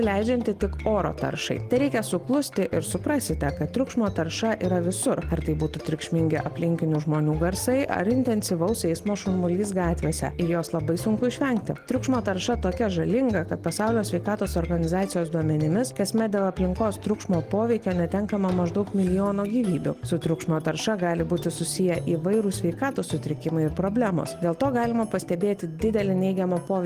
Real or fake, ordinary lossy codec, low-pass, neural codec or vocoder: real; Opus, 24 kbps; 14.4 kHz; none